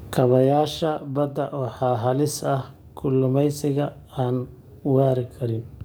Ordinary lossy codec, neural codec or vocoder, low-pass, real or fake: none; codec, 44.1 kHz, 7.8 kbps, DAC; none; fake